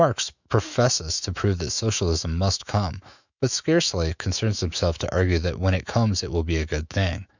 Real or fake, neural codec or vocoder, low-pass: fake; autoencoder, 48 kHz, 128 numbers a frame, DAC-VAE, trained on Japanese speech; 7.2 kHz